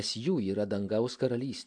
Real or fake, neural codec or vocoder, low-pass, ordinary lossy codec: real; none; 9.9 kHz; AAC, 64 kbps